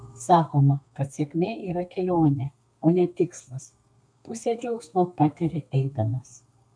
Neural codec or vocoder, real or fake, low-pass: codec, 32 kHz, 1.9 kbps, SNAC; fake; 9.9 kHz